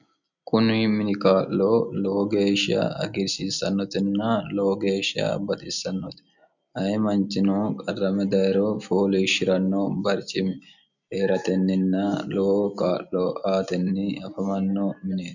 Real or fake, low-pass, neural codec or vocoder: real; 7.2 kHz; none